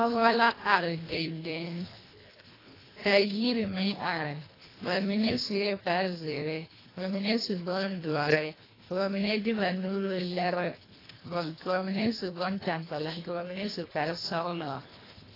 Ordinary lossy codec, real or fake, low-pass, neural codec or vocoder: AAC, 24 kbps; fake; 5.4 kHz; codec, 24 kHz, 1.5 kbps, HILCodec